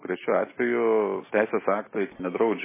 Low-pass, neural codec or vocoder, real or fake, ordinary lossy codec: 3.6 kHz; none; real; MP3, 16 kbps